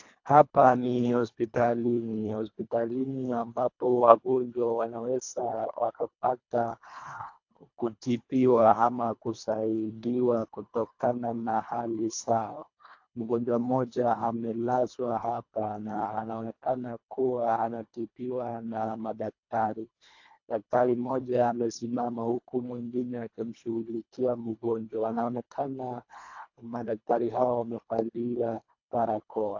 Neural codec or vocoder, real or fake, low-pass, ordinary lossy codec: codec, 24 kHz, 1.5 kbps, HILCodec; fake; 7.2 kHz; AAC, 48 kbps